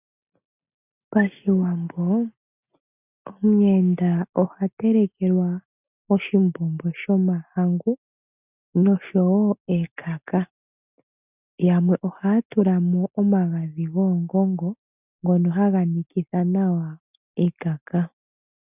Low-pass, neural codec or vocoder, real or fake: 3.6 kHz; none; real